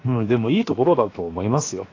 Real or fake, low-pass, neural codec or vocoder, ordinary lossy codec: fake; 7.2 kHz; codec, 16 kHz, 0.7 kbps, FocalCodec; AAC, 32 kbps